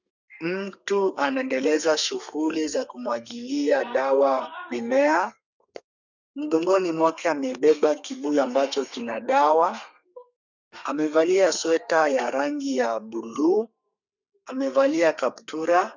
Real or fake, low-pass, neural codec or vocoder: fake; 7.2 kHz; codec, 32 kHz, 1.9 kbps, SNAC